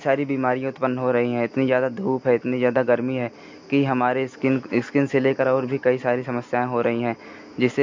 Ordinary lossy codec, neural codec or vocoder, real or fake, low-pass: MP3, 48 kbps; none; real; 7.2 kHz